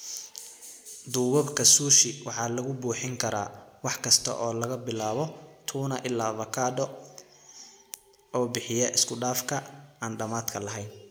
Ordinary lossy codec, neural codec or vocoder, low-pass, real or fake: none; none; none; real